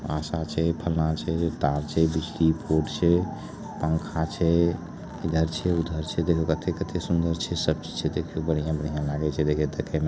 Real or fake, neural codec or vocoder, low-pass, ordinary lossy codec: real; none; none; none